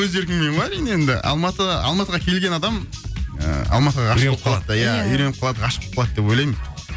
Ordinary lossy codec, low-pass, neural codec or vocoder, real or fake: none; none; none; real